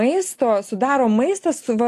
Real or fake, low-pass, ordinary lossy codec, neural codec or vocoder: real; 14.4 kHz; AAC, 64 kbps; none